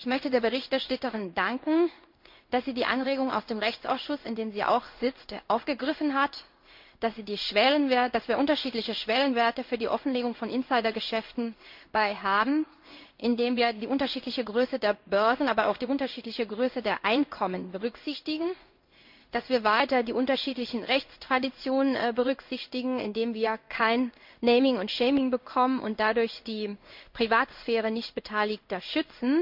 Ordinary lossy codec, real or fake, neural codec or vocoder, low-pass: MP3, 48 kbps; fake; codec, 16 kHz in and 24 kHz out, 1 kbps, XY-Tokenizer; 5.4 kHz